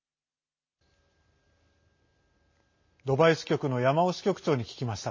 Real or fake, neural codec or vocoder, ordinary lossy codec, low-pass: real; none; MP3, 32 kbps; 7.2 kHz